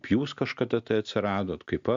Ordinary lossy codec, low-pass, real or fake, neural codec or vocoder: AAC, 64 kbps; 7.2 kHz; real; none